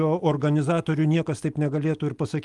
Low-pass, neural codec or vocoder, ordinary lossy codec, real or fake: 10.8 kHz; none; Opus, 24 kbps; real